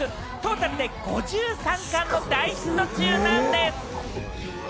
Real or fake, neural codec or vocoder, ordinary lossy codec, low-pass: real; none; none; none